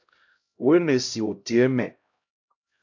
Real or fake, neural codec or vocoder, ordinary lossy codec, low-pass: fake; codec, 16 kHz, 0.5 kbps, X-Codec, HuBERT features, trained on LibriSpeech; AAC, 48 kbps; 7.2 kHz